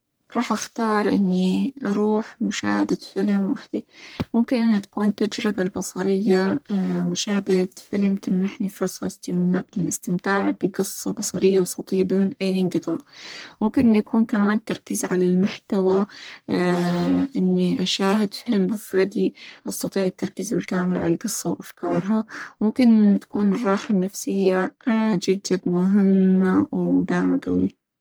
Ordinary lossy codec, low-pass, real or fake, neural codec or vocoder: none; none; fake; codec, 44.1 kHz, 1.7 kbps, Pupu-Codec